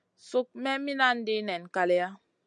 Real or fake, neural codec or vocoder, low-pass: real; none; 9.9 kHz